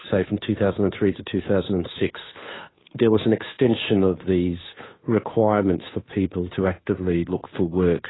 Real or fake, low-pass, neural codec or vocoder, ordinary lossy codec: fake; 7.2 kHz; codec, 16 kHz, 2 kbps, FunCodec, trained on Chinese and English, 25 frames a second; AAC, 16 kbps